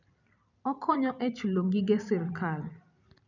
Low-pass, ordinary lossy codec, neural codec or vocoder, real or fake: 7.2 kHz; none; vocoder, 44.1 kHz, 128 mel bands every 256 samples, BigVGAN v2; fake